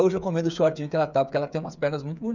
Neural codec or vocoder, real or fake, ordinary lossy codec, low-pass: codec, 16 kHz, 8 kbps, FreqCodec, smaller model; fake; none; 7.2 kHz